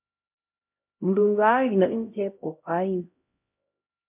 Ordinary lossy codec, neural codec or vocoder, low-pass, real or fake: MP3, 32 kbps; codec, 16 kHz, 0.5 kbps, X-Codec, HuBERT features, trained on LibriSpeech; 3.6 kHz; fake